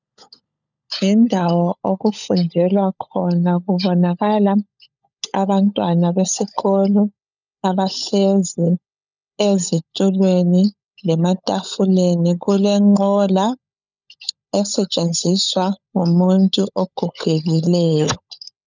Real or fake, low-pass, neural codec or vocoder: fake; 7.2 kHz; codec, 16 kHz, 16 kbps, FunCodec, trained on LibriTTS, 50 frames a second